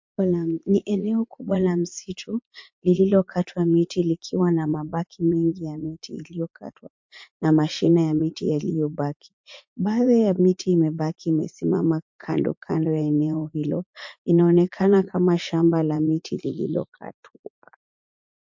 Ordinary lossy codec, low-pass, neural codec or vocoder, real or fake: MP3, 48 kbps; 7.2 kHz; vocoder, 44.1 kHz, 80 mel bands, Vocos; fake